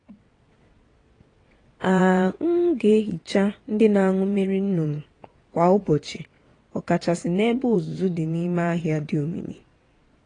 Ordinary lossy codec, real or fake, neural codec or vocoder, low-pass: AAC, 32 kbps; fake; vocoder, 22.05 kHz, 80 mel bands, WaveNeXt; 9.9 kHz